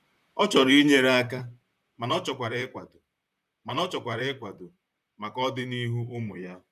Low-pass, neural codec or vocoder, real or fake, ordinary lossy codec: 14.4 kHz; vocoder, 44.1 kHz, 128 mel bands, Pupu-Vocoder; fake; none